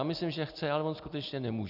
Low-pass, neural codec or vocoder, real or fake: 5.4 kHz; none; real